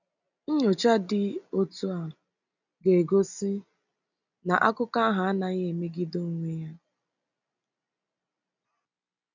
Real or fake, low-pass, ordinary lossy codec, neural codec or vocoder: real; 7.2 kHz; none; none